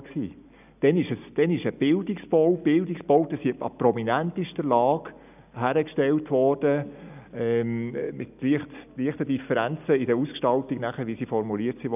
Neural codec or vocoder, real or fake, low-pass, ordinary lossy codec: none; real; 3.6 kHz; none